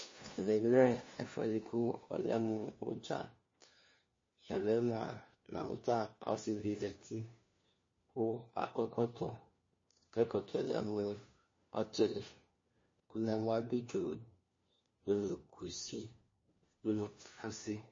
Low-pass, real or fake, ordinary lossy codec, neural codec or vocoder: 7.2 kHz; fake; MP3, 32 kbps; codec, 16 kHz, 1 kbps, FunCodec, trained on LibriTTS, 50 frames a second